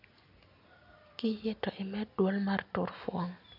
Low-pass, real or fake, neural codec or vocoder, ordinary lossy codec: 5.4 kHz; real; none; none